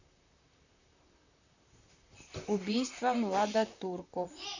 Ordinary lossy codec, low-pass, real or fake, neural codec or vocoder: none; 7.2 kHz; fake; vocoder, 44.1 kHz, 128 mel bands, Pupu-Vocoder